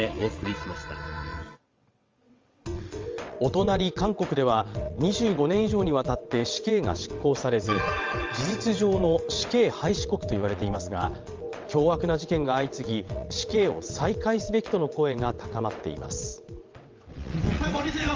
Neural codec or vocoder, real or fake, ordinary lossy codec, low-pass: vocoder, 22.05 kHz, 80 mel bands, WaveNeXt; fake; Opus, 32 kbps; 7.2 kHz